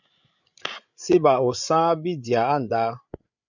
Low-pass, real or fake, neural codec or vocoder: 7.2 kHz; fake; codec, 16 kHz, 8 kbps, FreqCodec, larger model